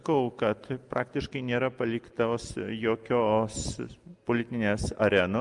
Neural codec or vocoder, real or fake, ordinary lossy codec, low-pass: none; real; Opus, 32 kbps; 10.8 kHz